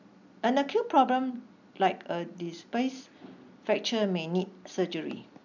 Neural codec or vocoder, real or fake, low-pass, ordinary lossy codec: none; real; 7.2 kHz; none